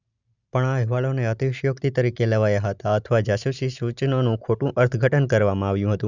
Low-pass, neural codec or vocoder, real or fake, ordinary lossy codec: 7.2 kHz; none; real; none